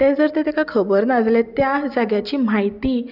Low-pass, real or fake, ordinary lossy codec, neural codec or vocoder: 5.4 kHz; real; none; none